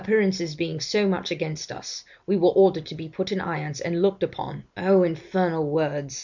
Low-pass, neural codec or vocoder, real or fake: 7.2 kHz; none; real